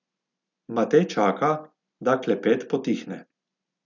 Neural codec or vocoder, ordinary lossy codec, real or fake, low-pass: none; none; real; 7.2 kHz